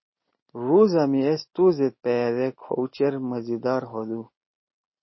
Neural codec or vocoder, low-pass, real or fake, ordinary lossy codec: none; 7.2 kHz; real; MP3, 24 kbps